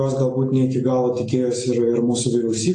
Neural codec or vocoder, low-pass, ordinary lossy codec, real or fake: none; 10.8 kHz; AAC, 48 kbps; real